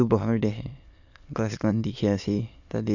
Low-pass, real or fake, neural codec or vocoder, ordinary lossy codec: 7.2 kHz; fake; autoencoder, 22.05 kHz, a latent of 192 numbers a frame, VITS, trained on many speakers; none